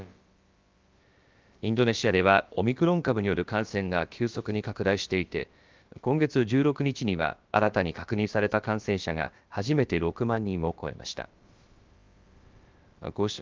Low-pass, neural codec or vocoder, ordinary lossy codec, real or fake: 7.2 kHz; codec, 16 kHz, about 1 kbps, DyCAST, with the encoder's durations; Opus, 24 kbps; fake